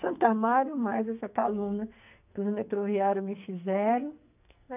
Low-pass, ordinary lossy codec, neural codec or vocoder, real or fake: 3.6 kHz; none; codec, 44.1 kHz, 2.6 kbps, SNAC; fake